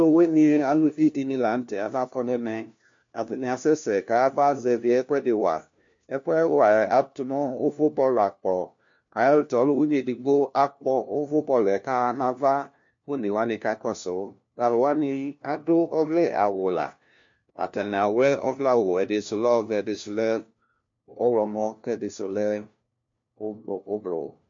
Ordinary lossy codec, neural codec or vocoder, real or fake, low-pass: MP3, 48 kbps; codec, 16 kHz, 1 kbps, FunCodec, trained on LibriTTS, 50 frames a second; fake; 7.2 kHz